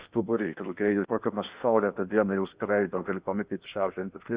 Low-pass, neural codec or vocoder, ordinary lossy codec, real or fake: 3.6 kHz; codec, 16 kHz in and 24 kHz out, 0.6 kbps, FocalCodec, streaming, 4096 codes; Opus, 64 kbps; fake